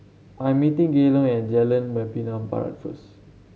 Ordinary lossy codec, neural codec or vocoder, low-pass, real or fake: none; none; none; real